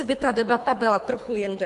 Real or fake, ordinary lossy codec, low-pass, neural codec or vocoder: fake; AAC, 64 kbps; 10.8 kHz; codec, 24 kHz, 3 kbps, HILCodec